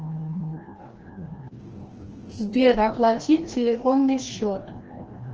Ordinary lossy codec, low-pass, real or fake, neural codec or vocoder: Opus, 16 kbps; 7.2 kHz; fake; codec, 16 kHz, 1 kbps, FreqCodec, larger model